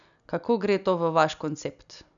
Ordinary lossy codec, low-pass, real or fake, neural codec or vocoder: none; 7.2 kHz; real; none